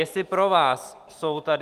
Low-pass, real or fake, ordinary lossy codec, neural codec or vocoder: 14.4 kHz; real; Opus, 32 kbps; none